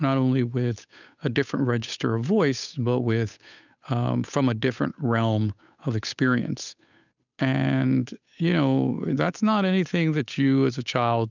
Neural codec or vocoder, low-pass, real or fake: codec, 16 kHz, 8 kbps, FunCodec, trained on Chinese and English, 25 frames a second; 7.2 kHz; fake